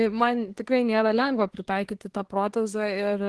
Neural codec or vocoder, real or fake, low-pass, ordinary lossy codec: codec, 24 kHz, 1 kbps, SNAC; fake; 10.8 kHz; Opus, 16 kbps